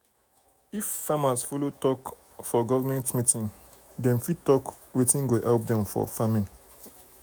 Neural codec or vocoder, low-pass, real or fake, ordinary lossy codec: autoencoder, 48 kHz, 128 numbers a frame, DAC-VAE, trained on Japanese speech; none; fake; none